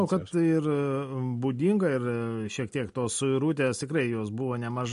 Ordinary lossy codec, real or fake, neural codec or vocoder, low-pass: MP3, 48 kbps; real; none; 10.8 kHz